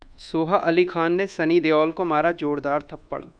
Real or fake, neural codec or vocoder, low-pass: fake; codec, 24 kHz, 1.2 kbps, DualCodec; 9.9 kHz